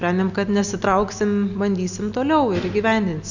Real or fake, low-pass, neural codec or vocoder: real; 7.2 kHz; none